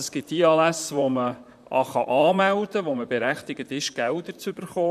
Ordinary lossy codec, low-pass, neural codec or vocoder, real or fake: none; 14.4 kHz; vocoder, 44.1 kHz, 128 mel bands, Pupu-Vocoder; fake